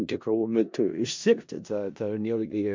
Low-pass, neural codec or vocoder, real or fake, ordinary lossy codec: 7.2 kHz; codec, 16 kHz in and 24 kHz out, 0.4 kbps, LongCat-Audio-Codec, four codebook decoder; fake; MP3, 64 kbps